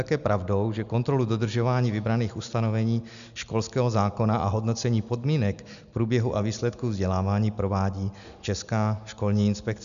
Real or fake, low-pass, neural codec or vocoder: real; 7.2 kHz; none